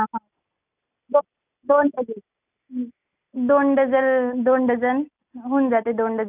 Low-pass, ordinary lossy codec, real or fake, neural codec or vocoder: 3.6 kHz; none; real; none